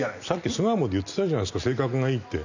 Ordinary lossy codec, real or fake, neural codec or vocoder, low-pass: none; real; none; 7.2 kHz